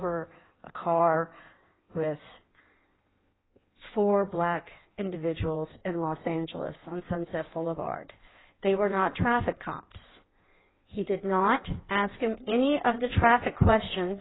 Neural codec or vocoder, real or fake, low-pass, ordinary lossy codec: codec, 16 kHz in and 24 kHz out, 1.1 kbps, FireRedTTS-2 codec; fake; 7.2 kHz; AAC, 16 kbps